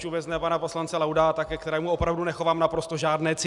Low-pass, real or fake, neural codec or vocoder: 10.8 kHz; real; none